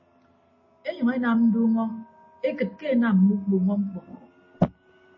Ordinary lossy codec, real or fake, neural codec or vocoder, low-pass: MP3, 32 kbps; real; none; 7.2 kHz